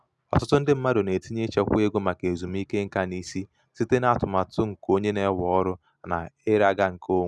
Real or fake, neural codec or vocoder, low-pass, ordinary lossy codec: real; none; none; none